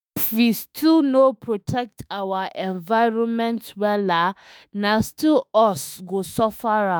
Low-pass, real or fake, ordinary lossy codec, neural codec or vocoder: none; fake; none; autoencoder, 48 kHz, 32 numbers a frame, DAC-VAE, trained on Japanese speech